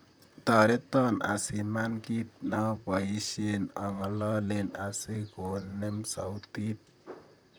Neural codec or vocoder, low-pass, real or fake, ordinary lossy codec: vocoder, 44.1 kHz, 128 mel bands, Pupu-Vocoder; none; fake; none